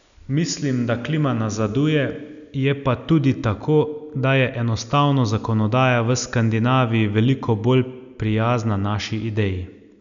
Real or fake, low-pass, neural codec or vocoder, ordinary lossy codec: real; 7.2 kHz; none; none